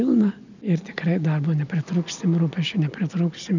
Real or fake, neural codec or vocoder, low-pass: real; none; 7.2 kHz